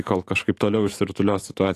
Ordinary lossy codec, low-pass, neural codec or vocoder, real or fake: AAC, 48 kbps; 14.4 kHz; autoencoder, 48 kHz, 128 numbers a frame, DAC-VAE, trained on Japanese speech; fake